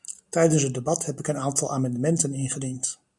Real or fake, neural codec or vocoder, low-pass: real; none; 10.8 kHz